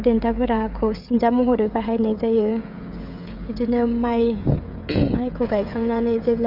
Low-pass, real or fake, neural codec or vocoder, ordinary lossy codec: 5.4 kHz; fake; codec, 16 kHz, 16 kbps, FreqCodec, smaller model; none